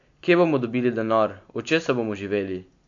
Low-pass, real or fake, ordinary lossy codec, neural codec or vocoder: 7.2 kHz; real; AAC, 64 kbps; none